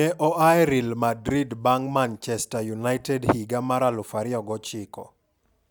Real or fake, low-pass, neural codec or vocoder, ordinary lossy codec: real; none; none; none